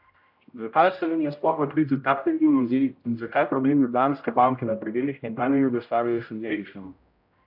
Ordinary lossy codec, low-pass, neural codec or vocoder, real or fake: AAC, 48 kbps; 5.4 kHz; codec, 16 kHz, 0.5 kbps, X-Codec, HuBERT features, trained on general audio; fake